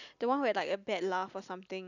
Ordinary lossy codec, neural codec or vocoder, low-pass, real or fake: none; none; 7.2 kHz; real